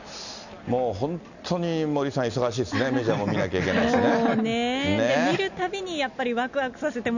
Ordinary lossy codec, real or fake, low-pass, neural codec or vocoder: none; real; 7.2 kHz; none